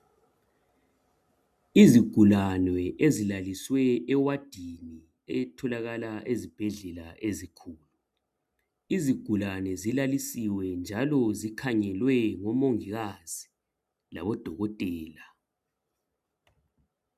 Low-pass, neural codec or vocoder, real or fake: 14.4 kHz; none; real